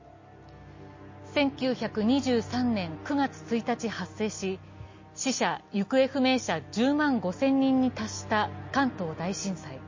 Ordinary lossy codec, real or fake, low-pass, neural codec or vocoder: MP3, 32 kbps; real; 7.2 kHz; none